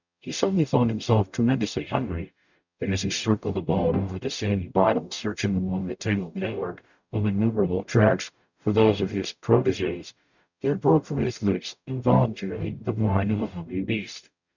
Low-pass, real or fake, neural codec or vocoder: 7.2 kHz; fake; codec, 44.1 kHz, 0.9 kbps, DAC